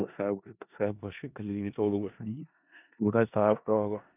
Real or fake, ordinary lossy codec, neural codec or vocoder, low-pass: fake; none; codec, 16 kHz in and 24 kHz out, 0.4 kbps, LongCat-Audio-Codec, four codebook decoder; 3.6 kHz